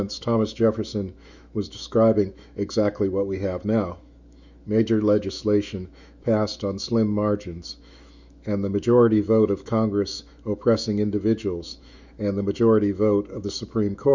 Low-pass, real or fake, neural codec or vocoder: 7.2 kHz; fake; autoencoder, 48 kHz, 128 numbers a frame, DAC-VAE, trained on Japanese speech